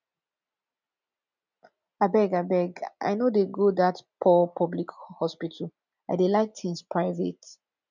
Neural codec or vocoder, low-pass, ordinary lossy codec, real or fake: none; 7.2 kHz; none; real